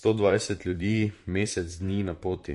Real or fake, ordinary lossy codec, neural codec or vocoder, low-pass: fake; MP3, 48 kbps; vocoder, 44.1 kHz, 128 mel bands, Pupu-Vocoder; 14.4 kHz